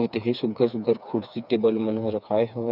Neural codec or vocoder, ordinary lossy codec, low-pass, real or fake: codec, 16 kHz, 4 kbps, FreqCodec, smaller model; none; 5.4 kHz; fake